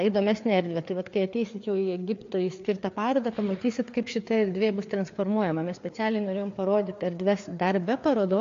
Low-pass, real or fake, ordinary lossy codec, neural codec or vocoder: 7.2 kHz; fake; AAC, 48 kbps; codec, 16 kHz, 4 kbps, FreqCodec, larger model